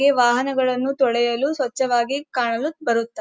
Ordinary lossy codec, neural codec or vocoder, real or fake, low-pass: none; none; real; none